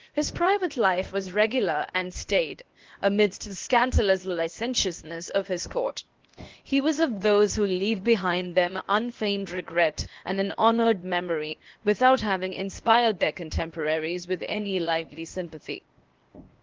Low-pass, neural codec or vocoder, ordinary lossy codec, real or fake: 7.2 kHz; codec, 16 kHz, 0.8 kbps, ZipCodec; Opus, 16 kbps; fake